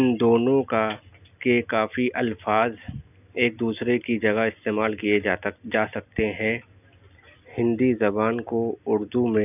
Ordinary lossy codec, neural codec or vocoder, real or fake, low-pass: none; none; real; 3.6 kHz